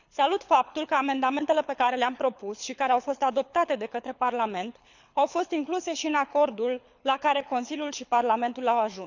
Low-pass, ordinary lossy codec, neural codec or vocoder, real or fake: 7.2 kHz; none; codec, 24 kHz, 6 kbps, HILCodec; fake